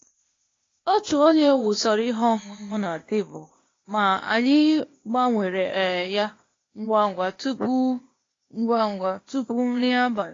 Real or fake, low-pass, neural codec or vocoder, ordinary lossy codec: fake; 7.2 kHz; codec, 16 kHz, 0.8 kbps, ZipCodec; AAC, 32 kbps